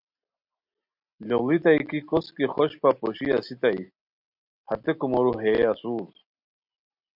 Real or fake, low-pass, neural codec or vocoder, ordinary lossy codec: real; 5.4 kHz; none; MP3, 48 kbps